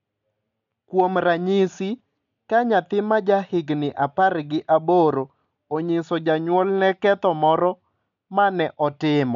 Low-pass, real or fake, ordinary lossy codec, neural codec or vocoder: 7.2 kHz; real; none; none